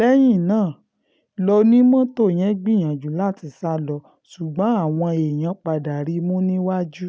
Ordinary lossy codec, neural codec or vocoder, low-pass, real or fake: none; none; none; real